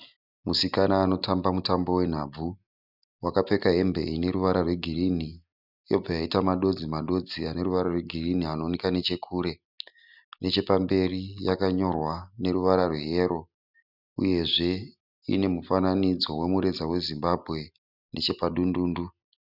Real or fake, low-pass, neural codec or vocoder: real; 5.4 kHz; none